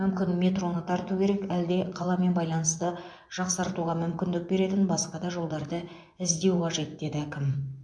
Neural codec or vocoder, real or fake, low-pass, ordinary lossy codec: vocoder, 24 kHz, 100 mel bands, Vocos; fake; 9.9 kHz; MP3, 64 kbps